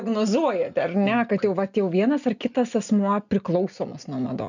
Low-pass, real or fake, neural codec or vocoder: 7.2 kHz; real; none